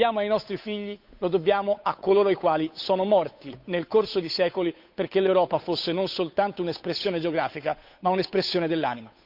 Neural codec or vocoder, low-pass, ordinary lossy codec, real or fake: codec, 16 kHz, 8 kbps, FunCodec, trained on Chinese and English, 25 frames a second; 5.4 kHz; none; fake